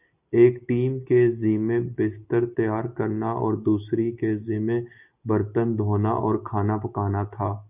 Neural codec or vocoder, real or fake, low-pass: none; real; 3.6 kHz